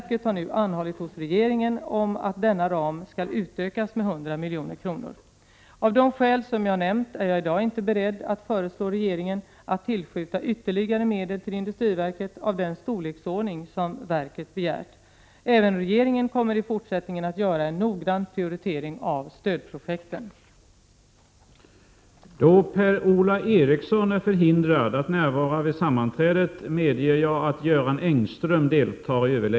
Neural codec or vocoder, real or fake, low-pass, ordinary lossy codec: none; real; none; none